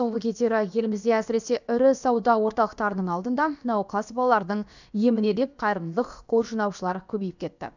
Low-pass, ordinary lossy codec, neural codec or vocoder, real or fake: 7.2 kHz; none; codec, 16 kHz, about 1 kbps, DyCAST, with the encoder's durations; fake